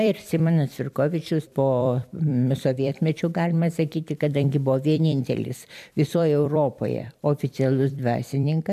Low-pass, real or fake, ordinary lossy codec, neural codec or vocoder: 14.4 kHz; fake; MP3, 96 kbps; vocoder, 44.1 kHz, 128 mel bands every 256 samples, BigVGAN v2